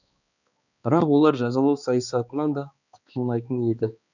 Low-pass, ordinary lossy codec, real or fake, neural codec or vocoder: 7.2 kHz; none; fake; codec, 16 kHz, 2 kbps, X-Codec, HuBERT features, trained on balanced general audio